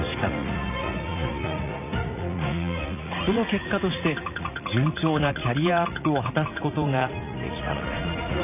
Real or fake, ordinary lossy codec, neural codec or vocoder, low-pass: fake; none; vocoder, 44.1 kHz, 80 mel bands, Vocos; 3.6 kHz